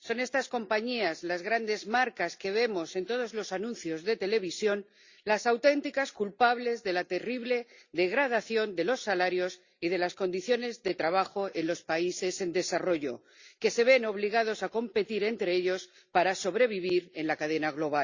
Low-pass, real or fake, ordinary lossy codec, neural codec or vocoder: 7.2 kHz; real; Opus, 64 kbps; none